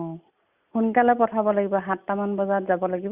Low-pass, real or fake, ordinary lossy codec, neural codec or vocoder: 3.6 kHz; real; Opus, 64 kbps; none